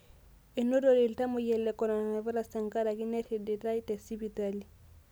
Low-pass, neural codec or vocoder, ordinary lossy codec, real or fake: none; none; none; real